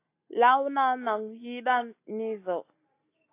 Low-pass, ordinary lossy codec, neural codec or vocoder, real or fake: 3.6 kHz; AAC, 24 kbps; none; real